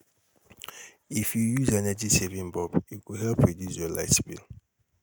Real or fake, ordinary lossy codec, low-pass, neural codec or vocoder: real; none; none; none